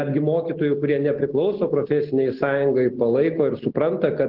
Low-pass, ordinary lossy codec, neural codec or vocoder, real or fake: 5.4 kHz; Opus, 16 kbps; none; real